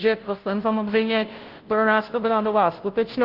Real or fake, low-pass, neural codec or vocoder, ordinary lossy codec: fake; 5.4 kHz; codec, 16 kHz, 0.5 kbps, FunCodec, trained on Chinese and English, 25 frames a second; Opus, 16 kbps